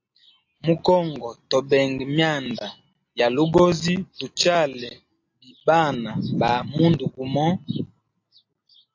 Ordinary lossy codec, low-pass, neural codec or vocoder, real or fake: AAC, 48 kbps; 7.2 kHz; none; real